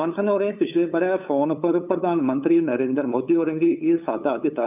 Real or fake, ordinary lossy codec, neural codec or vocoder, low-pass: fake; none; codec, 16 kHz, 8 kbps, FunCodec, trained on LibriTTS, 25 frames a second; 3.6 kHz